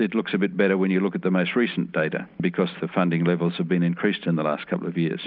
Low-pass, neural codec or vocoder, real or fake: 5.4 kHz; none; real